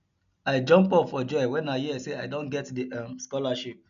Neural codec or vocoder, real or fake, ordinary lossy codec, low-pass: none; real; none; 7.2 kHz